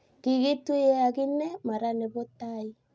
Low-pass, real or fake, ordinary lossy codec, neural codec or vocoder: none; real; none; none